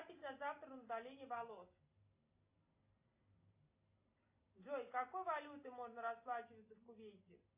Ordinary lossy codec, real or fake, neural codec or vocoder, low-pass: AAC, 32 kbps; real; none; 3.6 kHz